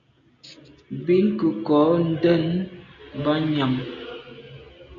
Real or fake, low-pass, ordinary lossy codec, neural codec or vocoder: real; 7.2 kHz; MP3, 64 kbps; none